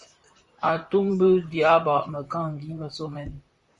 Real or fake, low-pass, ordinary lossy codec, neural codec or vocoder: fake; 10.8 kHz; Opus, 64 kbps; vocoder, 44.1 kHz, 128 mel bands, Pupu-Vocoder